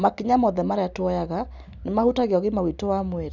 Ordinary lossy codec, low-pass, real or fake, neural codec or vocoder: none; 7.2 kHz; real; none